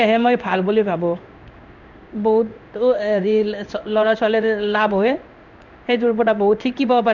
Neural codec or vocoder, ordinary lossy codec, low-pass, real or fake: codec, 16 kHz in and 24 kHz out, 1 kbps, XY-Tokenizer; none; 7.2 kHz; fake